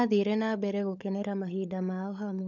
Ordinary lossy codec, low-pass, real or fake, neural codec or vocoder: none; 7.2 kHz; fake; codec, 16 kHz, 16 kbps, FunCodec, trained on LibriTTS, 50 frames a second